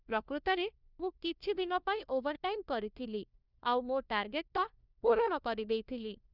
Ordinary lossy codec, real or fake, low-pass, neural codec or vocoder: none; fake; 5.4 kHz; codec, 16 kHz, 1 kbps, FunCodec, trained on LibriTTS, 50 frames a second